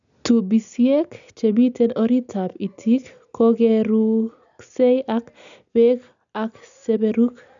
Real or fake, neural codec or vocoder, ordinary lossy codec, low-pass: real; none; none; 7.2 kHz